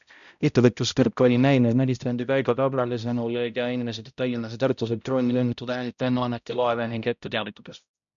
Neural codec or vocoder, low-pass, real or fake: codec, 16 kHz, 0.5 kbps, X-Codec, HuBERT features, trained on balanced general audio; 7.2 kHz; fake